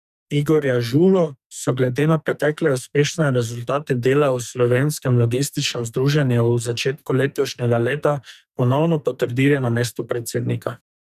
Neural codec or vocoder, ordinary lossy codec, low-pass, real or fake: codec, 44.1 kHz, 2.6 kbps, SNAC; none; 14.4 kHz; fake